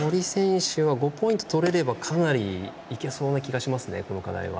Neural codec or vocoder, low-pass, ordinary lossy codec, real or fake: none; none; none; real